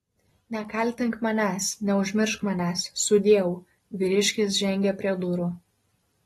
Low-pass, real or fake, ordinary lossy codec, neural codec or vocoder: 19.8 kHz; real; AAC, 32 kbps; none